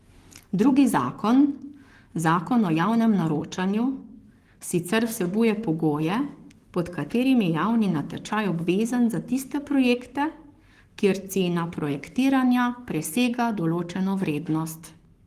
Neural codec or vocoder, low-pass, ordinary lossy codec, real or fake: codec, 44.1 kHz, 7.8 kbps, Pupu-Codec; 14.4 kHz; Opus, 24 kbps; fake